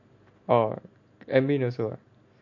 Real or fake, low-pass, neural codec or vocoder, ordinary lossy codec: real; 7.2 kHz; none; MP3, 48 kbps